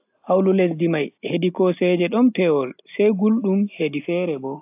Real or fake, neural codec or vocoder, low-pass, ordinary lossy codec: real; none; 3.6 kHz; AAC, 32 kbps